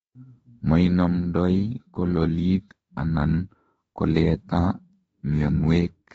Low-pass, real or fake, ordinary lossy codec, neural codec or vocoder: 19.8 kHz; fake; AAC, 24 kbps; autoencoder, 48 kHz, 32 numbers a frame, DAC-VAE, trained on Japanese speech